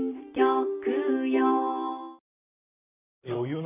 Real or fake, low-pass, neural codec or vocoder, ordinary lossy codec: fake; 3.6 kHz; vocoder, 44.1 kHz, 128 mel bands, Pupu-Vocoder; none